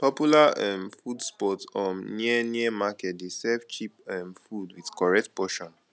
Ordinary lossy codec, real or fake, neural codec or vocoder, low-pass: none; real; none; none